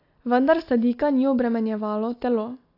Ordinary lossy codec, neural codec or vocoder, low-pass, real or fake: AAC, 32 kbps; none; 5.4 kHz; real